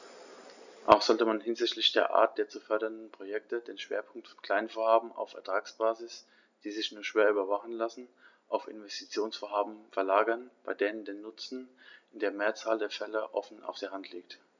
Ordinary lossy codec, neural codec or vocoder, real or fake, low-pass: none; none; real; 7.2 kHz